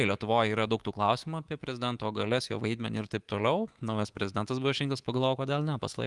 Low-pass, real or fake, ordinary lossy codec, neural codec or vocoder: 10.8 kHz; fake; Opus, 24 kbps; codec, 24 kHz, 3.1 kbps, DualCodec